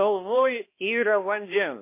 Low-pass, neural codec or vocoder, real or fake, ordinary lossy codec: 3.6 kHz; codec, 16 kHz, 1 kbps, X-Codec, HuBERT features, trained on balanced general audio; fake; MP3, 24 kbps